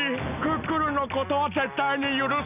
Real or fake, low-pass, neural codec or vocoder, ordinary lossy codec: real; 3.6 kHz; none; none